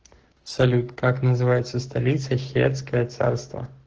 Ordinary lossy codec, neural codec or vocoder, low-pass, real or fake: Opus, 16 kbps; codec, 44.1 kHz, 7.8 kbps, Pupu-Codec; 7.2 kHz; fake